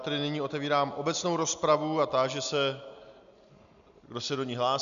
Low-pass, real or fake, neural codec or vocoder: 7.2 kHz; real; none